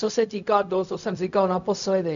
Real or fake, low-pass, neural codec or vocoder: fake; 7.2 kHz; codec, 16 kHz, 0.4 kbps, LongCat-Audio-Codec